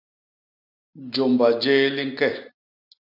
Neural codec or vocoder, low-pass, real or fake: none; 5.4 kHz; real